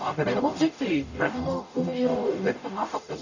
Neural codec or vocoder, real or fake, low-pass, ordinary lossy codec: codec, 44.1 kHz, 0.9 kbps, DAC; fake; 7.2 kHz; none